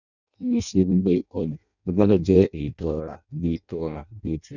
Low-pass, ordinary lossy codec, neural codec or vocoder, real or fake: 7.2 kHz; none; codec, 16 kHz in and 24 kHz out, 0.6 kbps, FireRedTTS-2 codec; fake